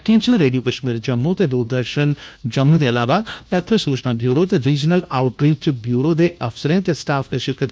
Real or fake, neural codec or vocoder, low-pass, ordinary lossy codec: fake; codec, 16 kHz, 1 kbps, FunCodec, trained on LibriTTS, 50 frames a second; none; none